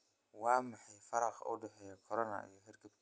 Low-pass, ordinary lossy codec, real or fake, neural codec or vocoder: none; none; real; none